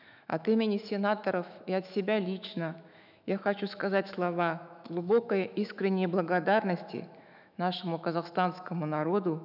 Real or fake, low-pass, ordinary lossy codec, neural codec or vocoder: fake; 5.4 kHz; none; autoencoder, 48 kHz, 128 numbers a frame, DAC-VAE, trained on Japanese speech